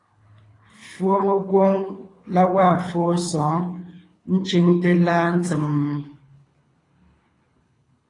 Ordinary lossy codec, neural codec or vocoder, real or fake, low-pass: AAC, 32 kbps; codec, 24 kHz, 3 kbps, HILCodec; fake; 10.8 kHz